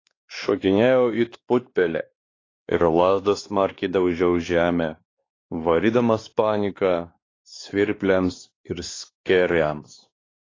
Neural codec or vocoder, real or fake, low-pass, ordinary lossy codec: codec, 16 kHz, 2 kbps, X-Codec, WavLM features, trained on Multilingual LibriSpeech; fake; 7.2 kHz; AAC, 32 kbps